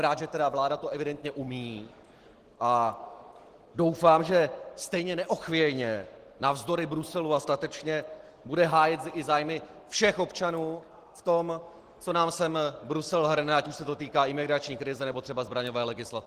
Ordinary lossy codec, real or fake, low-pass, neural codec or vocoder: Opus, 16 kbps; real; 14.4 kHz; none